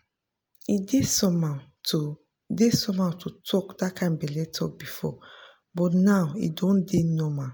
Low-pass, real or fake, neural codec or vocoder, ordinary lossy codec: none; real; none; none